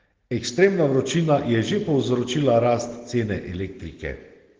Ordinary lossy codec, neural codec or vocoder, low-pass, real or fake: Opus, 16 kbps; none; 7.2 kHz; real